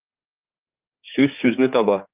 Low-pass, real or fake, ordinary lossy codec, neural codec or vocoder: 3.6 kHz; fake; Opus, 24 kbps; codec, 16 kHz, 4 kbps, X-Codec, HuBERT features, trained on general audio